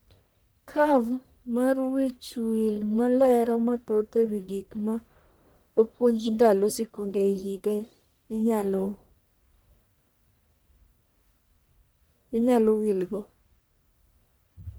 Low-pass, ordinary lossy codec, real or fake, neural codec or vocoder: none; none; fake; codec, 44.1 kHz, 1.7 kbps, Pupu-Codec